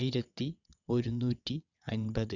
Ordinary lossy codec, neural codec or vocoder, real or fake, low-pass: none; vocoder, 22.05 kHz, 80 mel bands, WaveNeXt; fake; 7.2 kHz